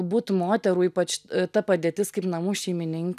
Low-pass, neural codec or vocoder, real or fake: 14.4 kHz; none; real